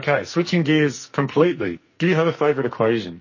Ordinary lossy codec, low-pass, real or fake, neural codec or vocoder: MP3, 32 kbps; 7.2 kHz; fake; codec, 44.1 kHz, 2.6 kbps, SNAC